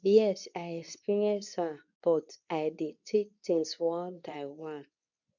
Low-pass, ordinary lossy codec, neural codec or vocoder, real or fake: 7.2 kHz; none; codec, 24 kHz, 0.9 kbps, WavTokenizer, medium speech release version 2; fake